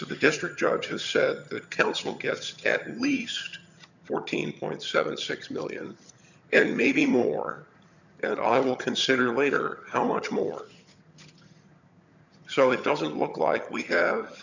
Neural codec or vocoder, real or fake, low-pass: vocoder, 22.05 kHz, 80 mel bands, HiFi-GAN; fake; 7.2 kHz